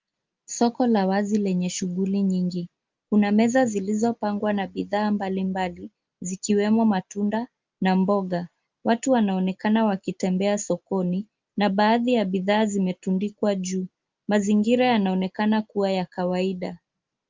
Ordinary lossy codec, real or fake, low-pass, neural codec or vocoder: Opus, 32 kbps; real; 7.2 kHz; none